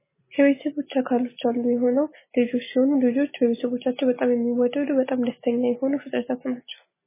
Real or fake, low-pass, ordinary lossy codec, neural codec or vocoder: real; 3.6 kHz; MP3, 16 kbps; none